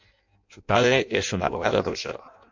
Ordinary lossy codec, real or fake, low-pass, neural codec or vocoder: MP3, 48 kbps; fake; 7.2 kHz; codec, 16 kHz in and 24 kHz out, 0.6 kbps, FireRedTTS-2 codec